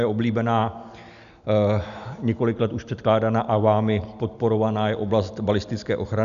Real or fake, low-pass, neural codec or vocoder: real; 7.2 kHz; none